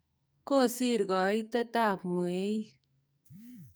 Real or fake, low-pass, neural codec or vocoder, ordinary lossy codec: fake; none; codec, 44.1 kHz, 2.6 kbps, SNAC; none